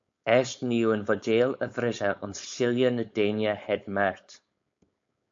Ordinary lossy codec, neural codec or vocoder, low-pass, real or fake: MP3, 48 kbps; codec, 16 kHz, 4.8 kbps, FACodec; 7.2 kHz; fake